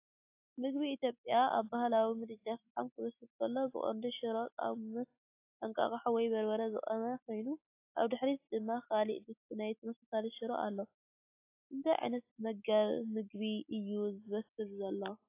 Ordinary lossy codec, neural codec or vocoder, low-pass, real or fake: AAC, 32 kbps; none; 3.6 kHz; real